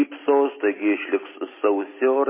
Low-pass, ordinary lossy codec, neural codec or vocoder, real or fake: 3.6 kHz; MP3, 16 kbps; none; real